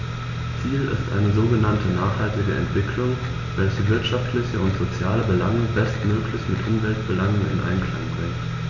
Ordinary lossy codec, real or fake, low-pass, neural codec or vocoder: none; real; 7.2 kHz; none